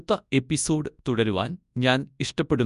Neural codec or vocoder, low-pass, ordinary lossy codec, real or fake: codec, 24 kHz, 0.9 kbps, WavTokenizer, large speech release; 10.8 kHz; none; fake